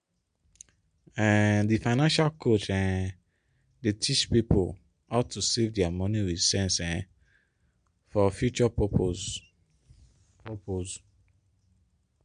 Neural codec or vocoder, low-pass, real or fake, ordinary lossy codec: none; 9.9 kHz; real; MP3, 64 kbps